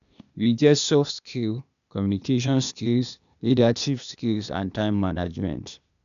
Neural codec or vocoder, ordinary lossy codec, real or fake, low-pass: codec, 16 kHz, 0.8 kbps, ZipCodec; none; fake; 7.2 kHz